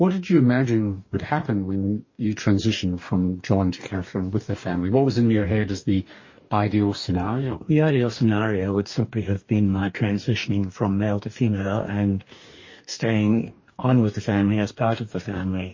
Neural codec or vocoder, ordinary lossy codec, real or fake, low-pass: codec, 44.1 kHz, 2.6 kbps, DAC; MP3, 32 kbps; fake; 7.2 kHz